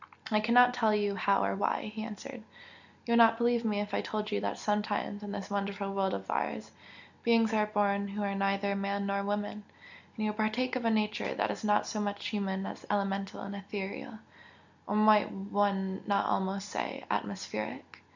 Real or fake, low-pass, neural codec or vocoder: real; 7.2 kHz; none